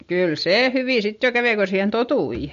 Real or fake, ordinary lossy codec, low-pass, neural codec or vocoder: real; MP3, 48 kbps; 7.2 kHz; none